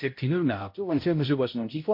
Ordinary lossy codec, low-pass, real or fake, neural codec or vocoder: MP3, 32 kbps; 5.4 kHz; fake; codec, 16 kHz, 0.5 kbps, X-Codec, HuBERT features, trained on balanced general audio